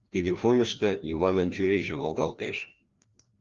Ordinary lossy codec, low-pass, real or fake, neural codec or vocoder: Opus, 32 kbps; 7.2 kHz; fake; codec, 16 kHz, 1 kbps, FreqCodec, larger model